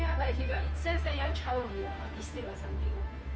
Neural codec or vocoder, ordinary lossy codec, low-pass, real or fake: codec, 16 kHz, 2 kbps, FunCodec, trained on Chinese and English, 25 frames a second; none; none; fake